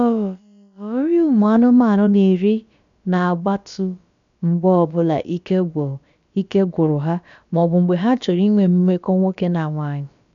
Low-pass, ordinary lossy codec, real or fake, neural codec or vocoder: 7.2 kHz; none; fake; codec, 16 kHz, about 1 kbps, DyCAST, with the encoder's durations